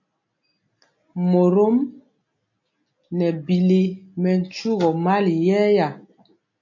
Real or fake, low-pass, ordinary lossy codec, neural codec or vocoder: real; 7.2 kHz; MP3, 48 kbps; none